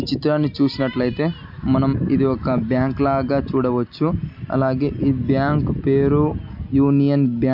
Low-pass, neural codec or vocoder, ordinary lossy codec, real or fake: 5.4 kHz; none; MP3, 48 kbps; real